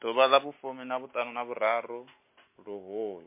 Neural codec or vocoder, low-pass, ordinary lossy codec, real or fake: none; 3.6 kHz; MP3, 24 kbps; real